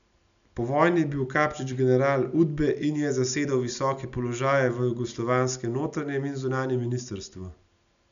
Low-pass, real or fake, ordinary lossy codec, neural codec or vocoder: 7.2 kHz; real; none; none